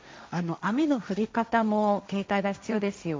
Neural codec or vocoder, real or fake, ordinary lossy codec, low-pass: codec, 16 kHz, 1.1 kbps, Voila-Tokenizer; fake; none; none